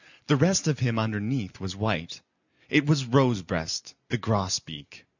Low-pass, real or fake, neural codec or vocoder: 7.2 kHz; real; none